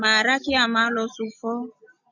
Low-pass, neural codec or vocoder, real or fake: 7.2 kHz; none; real